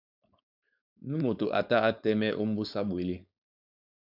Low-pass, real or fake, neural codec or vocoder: 5.4 kHz; fake; codec, 16 kHz, 4.8 kbps, FACodec